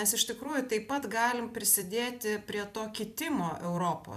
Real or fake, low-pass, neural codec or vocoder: fake; 14.4 kHz; vocoder, 44.1 kHz, 128 mel bands every 256 samples, BigVGAN v2